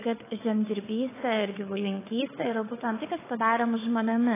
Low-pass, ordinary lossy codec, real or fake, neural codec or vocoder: 3.6 kHz; AAC, 16 kbps; fake; codec, 16 kHz, 4 kbps, FunCodec, trained on Chinese and English, 50 frames a second